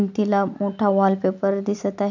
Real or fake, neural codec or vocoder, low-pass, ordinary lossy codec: real; none; 7.2 kHz; none